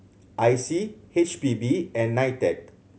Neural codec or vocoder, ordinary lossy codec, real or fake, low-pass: none; none; real; none